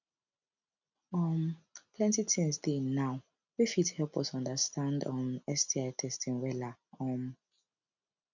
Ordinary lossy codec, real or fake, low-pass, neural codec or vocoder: none; real; 7.2 kHz; none